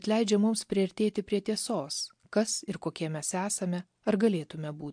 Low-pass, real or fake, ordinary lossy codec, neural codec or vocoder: 9.9 kHz; real; MP3, 64 kbps; none